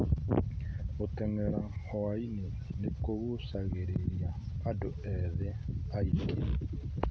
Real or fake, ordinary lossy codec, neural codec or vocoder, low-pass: real; none; none; none